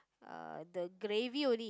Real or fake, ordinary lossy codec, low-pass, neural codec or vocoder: real; none; none; none